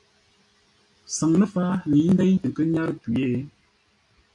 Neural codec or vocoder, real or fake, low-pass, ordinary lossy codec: none; real; 10.8 kHz; AAC, 64 kbps